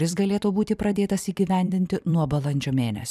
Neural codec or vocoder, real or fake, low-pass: vocoder, 44.1 kHz, 128 mel bands every 256 samples, BigVGAN v2; fake; 14.4 kHz